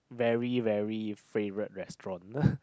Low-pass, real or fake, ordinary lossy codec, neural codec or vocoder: none; real; none; none